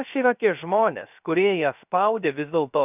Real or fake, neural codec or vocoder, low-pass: fake; codec, 16 kHz, 0.3 kbps, FocalCodec; 3.6 kHz